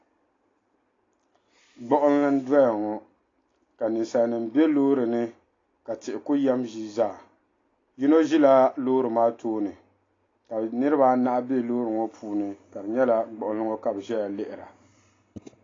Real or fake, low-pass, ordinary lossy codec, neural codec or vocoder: real; 7.2 kHz; AAC, 32 kbps; none